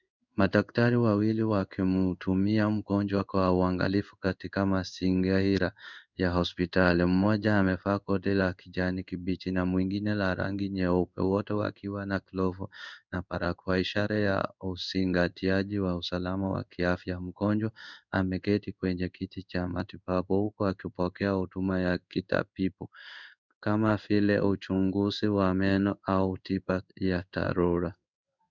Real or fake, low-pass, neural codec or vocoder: fake; 7.2 kHz; codec, 16 kHz in and 24 kHz out, 1 kbps, XY-Tokenizer